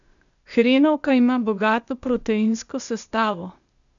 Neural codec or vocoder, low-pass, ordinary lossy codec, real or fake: codec, 16 kHz, 0.8 kbps, ZipCodec; 7.2 kHz; none; fake